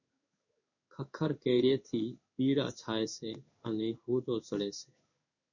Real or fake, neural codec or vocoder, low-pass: fake; codec, 16 kHz in and 24 kHz out, 1 kbps, XY-Tokenizer; 7.2 kHz